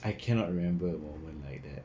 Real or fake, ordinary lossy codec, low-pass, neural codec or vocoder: real; none; none; none